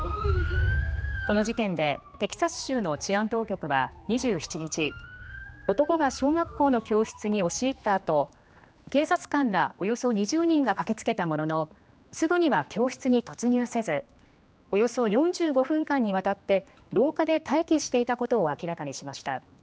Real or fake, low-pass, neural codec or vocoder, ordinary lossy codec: fake; none; codec, 16 kHz, 2 kbps, X-Codec, HuBERT features, trained on general audio; none